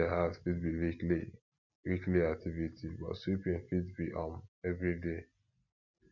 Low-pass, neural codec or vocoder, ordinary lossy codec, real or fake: 7.2 kHz; none; none; real